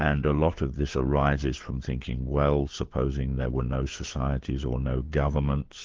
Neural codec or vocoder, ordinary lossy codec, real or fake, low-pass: codec, 16 kHz, 4 kbps, FunCodec, trained on LibriTTS, 50 frames a second; Opus, 16 kbps; fake; 7.2 kHz